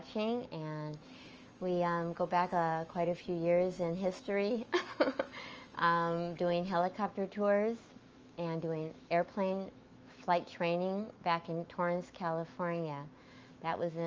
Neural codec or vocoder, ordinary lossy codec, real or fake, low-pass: autoencoder, 48 kHz, 128 numbers a frame, DAC-VAE, trained on Japanese speech; Opus, 24 kbps; fake; 7.2 kHz